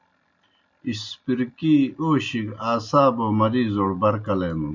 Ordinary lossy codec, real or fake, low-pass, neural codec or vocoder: MP3, 64 kbps; real; 7.2 kHz; none